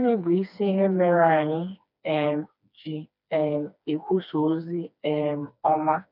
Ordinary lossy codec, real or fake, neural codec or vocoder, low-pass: none; fake; codec, 16 kHz, 2 kbps, FreqCodec, smaller model; 5.4 kHz